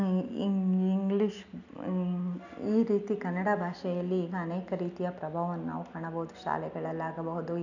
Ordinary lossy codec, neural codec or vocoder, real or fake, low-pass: none; none; real; 7.2 kHz